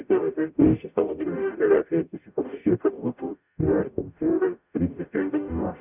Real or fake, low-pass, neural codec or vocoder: fake; 3.6 kHz; codec, 44.1 kHz, 0.9 kbps, DAC